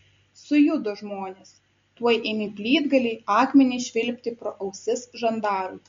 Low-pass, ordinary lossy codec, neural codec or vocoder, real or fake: 7.2 kHz; MP3, 48 kbps; none; real